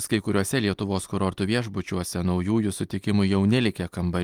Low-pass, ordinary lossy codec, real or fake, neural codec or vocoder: 19.8 kHz; Opus, 32 kbps; real; none